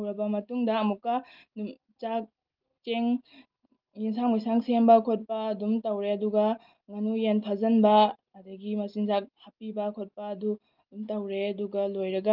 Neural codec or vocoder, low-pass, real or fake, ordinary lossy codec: none; 5.4 kHz; real; Opus, 32 kbps